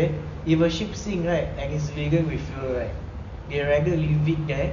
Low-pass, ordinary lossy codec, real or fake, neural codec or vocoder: 7.2 kHz; none; real; none